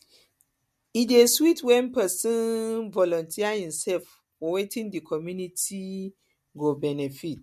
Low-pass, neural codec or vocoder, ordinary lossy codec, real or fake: 14.4 kHz; none; MP3, 64 kbps; real